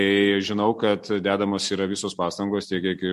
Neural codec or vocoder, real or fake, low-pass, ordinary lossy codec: none; real; 14.4 kHz; MP3, 64 kbps